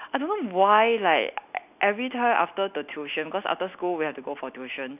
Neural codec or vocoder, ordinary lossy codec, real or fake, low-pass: none; none; real; 3.6 kHz